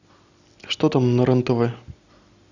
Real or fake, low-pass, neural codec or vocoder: real; 7.2 kHz; none